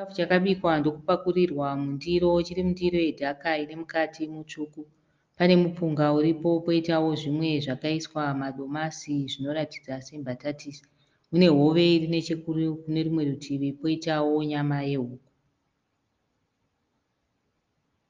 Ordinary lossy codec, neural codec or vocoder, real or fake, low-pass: Opus, 32 kbps; none; real; 7.2 kHz